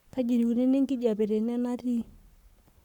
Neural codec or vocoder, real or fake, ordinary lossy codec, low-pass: codec, 44.1 kHz, 7.8 kbps, Pupu-Codec; fake; none; 19.8 kHz